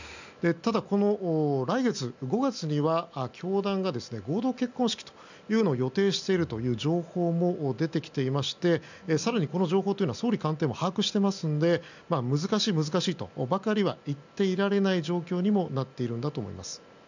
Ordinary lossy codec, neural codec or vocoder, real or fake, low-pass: none; none; real; 7.2 kHz